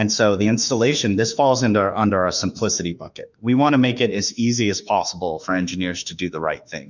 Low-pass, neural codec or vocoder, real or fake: 7.2 kHz; autoencoder, 48 kHz, 32 numbers a frame, DAC-VAE, trained on Japanese speech; fake